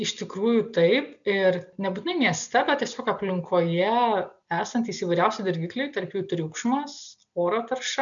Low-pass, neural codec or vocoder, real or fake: 7.2 kHz; none; real